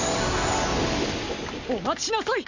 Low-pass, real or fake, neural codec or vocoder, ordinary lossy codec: 7.2 kHz; real; none; Opus, 64 kbps